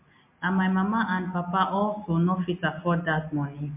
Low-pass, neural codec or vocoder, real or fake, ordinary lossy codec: 3.6 kHz; none; real; MP3, 24 kbps